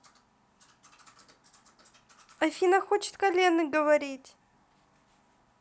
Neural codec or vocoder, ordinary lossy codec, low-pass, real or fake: none; none; none; real